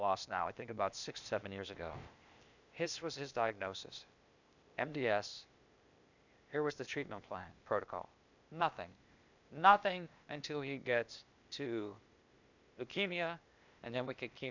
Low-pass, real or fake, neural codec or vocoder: 7.2 kHz; fake; codec, 16 kHz, 0.8 kbps, ZipCodec